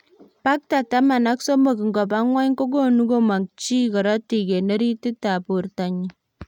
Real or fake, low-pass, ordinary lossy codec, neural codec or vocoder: real; 19.8 kHz; none; none